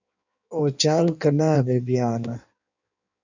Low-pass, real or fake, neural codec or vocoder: 7.2 kHz; fake; codec, 16 kHz in and 24 kHz out, 1.1 kbps, FireRedTTS-2 codec